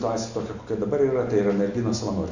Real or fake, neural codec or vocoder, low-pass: real; none; 7.2 kHz